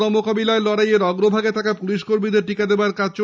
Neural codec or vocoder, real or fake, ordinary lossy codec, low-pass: none; real; none; none